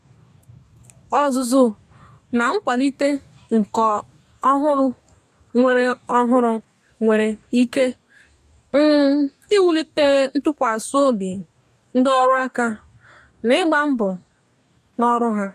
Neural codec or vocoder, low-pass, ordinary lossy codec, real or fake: codec, 44.1 kHz, 2.6 kbps, DAC; 14.4 kHz; AAC, 96 kbps; fake